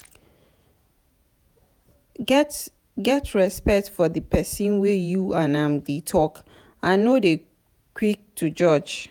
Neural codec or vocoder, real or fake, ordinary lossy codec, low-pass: vocoder, 48 kHz, 128 mel bands, Vocos; fake; none; none